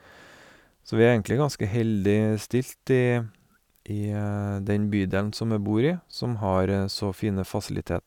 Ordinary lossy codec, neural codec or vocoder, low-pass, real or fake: none; none; 19.8 kHz; real